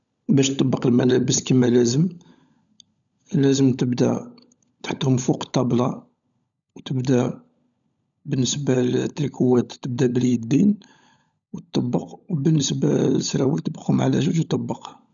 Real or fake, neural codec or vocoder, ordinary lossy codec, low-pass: fake; codec, 16 kHz, 16 kbps, FunCodec, trained on LibriTTS, 50 frames a second; none; 7.2 kHz